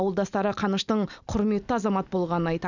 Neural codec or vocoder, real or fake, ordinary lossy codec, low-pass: none; real; none; 7.2 kHz